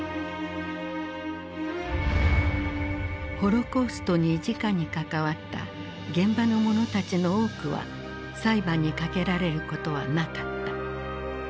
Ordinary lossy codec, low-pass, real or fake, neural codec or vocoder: none; none; real; none